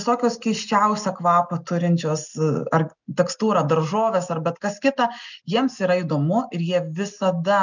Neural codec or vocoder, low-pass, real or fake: none; 7.2 kHz; real